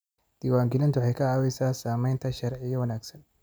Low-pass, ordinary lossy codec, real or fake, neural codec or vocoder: none; none; real; none